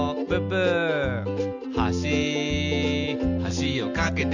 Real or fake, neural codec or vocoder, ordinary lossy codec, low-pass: real; none; none; 7.2 kHz